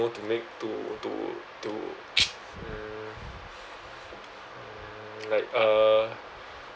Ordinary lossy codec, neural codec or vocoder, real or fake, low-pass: none; none; real; none